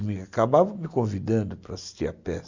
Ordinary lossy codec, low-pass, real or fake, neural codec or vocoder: MP3, 64 kbps; 7.2 kHz; real; none